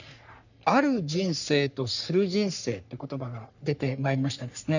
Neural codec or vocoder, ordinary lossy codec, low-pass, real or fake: codec, 44.1 kHz, 3.4 kbps, Pupu-Codec; none; 7.2 kHz; fake